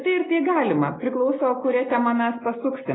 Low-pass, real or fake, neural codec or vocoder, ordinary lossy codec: 7.2 kHz; real; none; AAC, 16 kbps